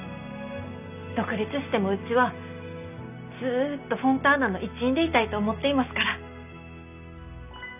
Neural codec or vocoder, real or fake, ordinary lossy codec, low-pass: none; real; none; 3.6 kHz